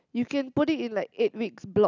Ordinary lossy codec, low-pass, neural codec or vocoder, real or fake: none; 7.2 kHz; none; real